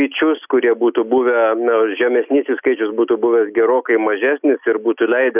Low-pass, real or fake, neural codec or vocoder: 3.6 kHz; real; none